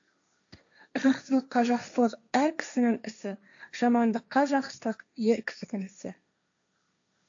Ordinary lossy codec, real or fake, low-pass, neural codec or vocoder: AAC, 64 kbps; fake; 7.2 kHz; codec, 16 kHz, 1.1 kbps, Voila-Tokenizer